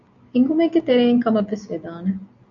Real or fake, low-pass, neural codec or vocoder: real; 7.2 kHz; none